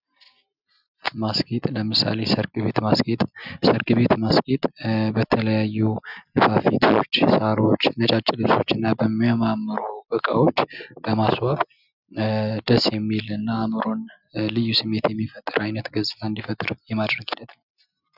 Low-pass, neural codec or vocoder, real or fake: 5.4 kHz; none; real